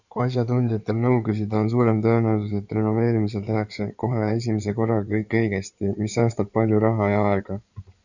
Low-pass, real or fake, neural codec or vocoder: 7.2 kHz; fake; codec, 16 kHz in and 24 kHz out, 2.2 kbps, FireRedTTS-2 codec